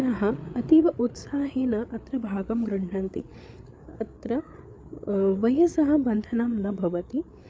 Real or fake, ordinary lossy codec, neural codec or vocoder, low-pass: fake; none; codec, 16 kHz, 8 kbps, FreqCodec, larger model; none